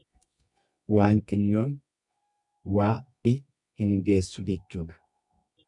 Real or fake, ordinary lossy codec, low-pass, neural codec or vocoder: fake; MP3, 96 kbps; 10.8 kHz; codec, 24 kHz, 0.9 kbps, WavTokenizer, medium music audio release